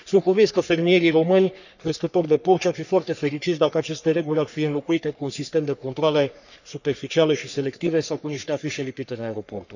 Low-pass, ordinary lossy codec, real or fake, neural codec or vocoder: 7.2 kHz; none; fake; codec, 44.1 kHz, 3.4 kbps, Pupu-Codec